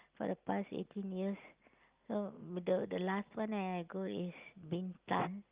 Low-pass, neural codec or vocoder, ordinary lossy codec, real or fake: 3.6 kHz; none; Opus, 32 kbps; real